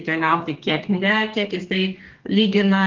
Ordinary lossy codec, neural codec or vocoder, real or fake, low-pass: Opus, 16 kbps; codec, 32 kHz, 1.9 kbps, SNAC; fake; 7.2 kHz